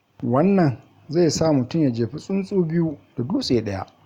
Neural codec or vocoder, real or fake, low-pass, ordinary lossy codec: none; real; 19.8 kHz; Opus, 64 kbps